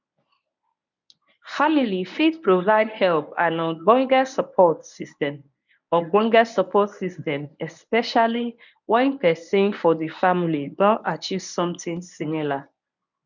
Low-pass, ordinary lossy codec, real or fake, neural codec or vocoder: 7.2 kHz; none; fake; codec, 24 kHz, 0.9 kbps, WavTokenizer, medium speech release version 1